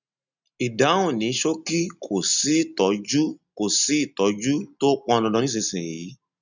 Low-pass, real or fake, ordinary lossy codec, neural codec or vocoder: 7.2 kHz; real; none; none